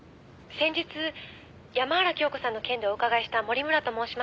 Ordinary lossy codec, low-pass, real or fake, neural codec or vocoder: none; none; real; none